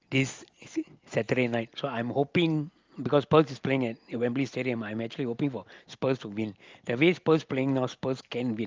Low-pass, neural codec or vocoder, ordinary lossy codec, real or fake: 7.2 kHz; none; Opus, 32 kbps; real